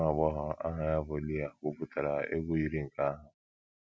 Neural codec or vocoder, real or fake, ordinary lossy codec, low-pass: none; real; none; none